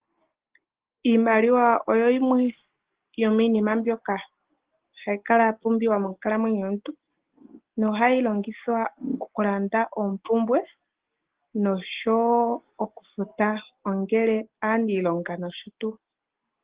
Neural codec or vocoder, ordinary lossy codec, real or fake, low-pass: none; Opus, 16 kbps; real; 3.6 kHz